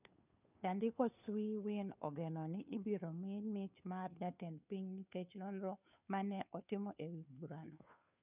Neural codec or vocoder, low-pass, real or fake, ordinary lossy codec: codec, 16 kHz, 2 kbps, FunCodec, trained on Chinese and English, 25 frames a second; 3.6 kHz; fake; none